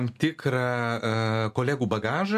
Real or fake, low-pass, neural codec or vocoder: real; 14.4 kHz; none